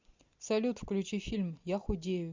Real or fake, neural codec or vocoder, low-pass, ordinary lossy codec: real; none; 7.2 kHz; MP3, 64 kbps